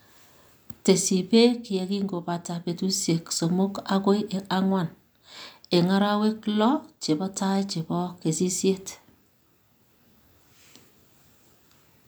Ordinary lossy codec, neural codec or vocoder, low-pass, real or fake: none; none; none; real